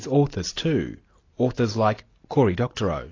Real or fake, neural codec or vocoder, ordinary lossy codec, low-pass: real; none; AAC, 32 kbps; 7.2 kHz